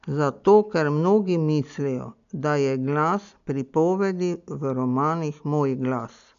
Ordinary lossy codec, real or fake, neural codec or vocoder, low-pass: none; real; none; 7.2 kHz